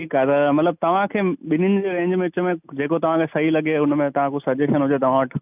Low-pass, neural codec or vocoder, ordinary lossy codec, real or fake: 3.6 kHz; none; none; real